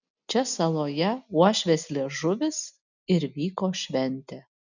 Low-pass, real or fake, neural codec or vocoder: 7.2 kHz; real; none